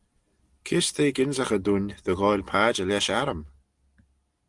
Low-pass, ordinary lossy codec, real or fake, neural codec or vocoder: 10.8 kHz; Opus, 24 kbps; real; none